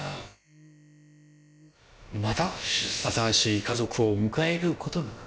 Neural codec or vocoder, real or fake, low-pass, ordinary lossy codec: codec, 16 kHz, about 1 kbps, DyCAST, with the encoder's durations; fake; none; none